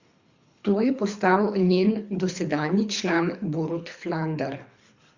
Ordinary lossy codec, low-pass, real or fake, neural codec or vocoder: Opus, 64 kbps; 7.2 kHz; fake; codec, 24 kHz, 3 kbps, HILCodec